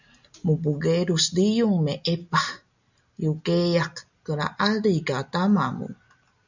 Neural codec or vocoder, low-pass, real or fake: none; 7.2 kHz; real